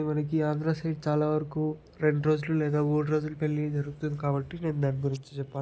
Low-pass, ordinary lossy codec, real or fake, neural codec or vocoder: none; none; real; none